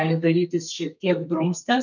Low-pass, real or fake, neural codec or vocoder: 7.2 kHz; fake; codec, 32 kHz, 1.9 kbps, SNAC